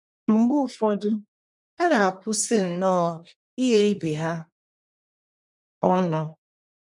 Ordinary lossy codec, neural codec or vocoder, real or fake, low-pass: none; codec, 24 kHz, 1 kbps, SNAC; fake; 10.8 kHz